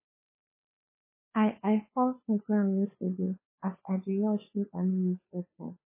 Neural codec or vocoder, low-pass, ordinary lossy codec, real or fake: codec, 16 kHz, 2 kbps, FunCodec, trained on Chinese and English, 25 frames a second; 3.6 kHz; MP3, 16 kbps; fake